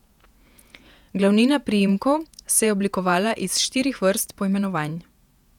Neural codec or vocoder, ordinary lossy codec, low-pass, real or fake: vocoder, 48 kHz, 128 mel bands, Vocos; none; 19.8 kHz; fake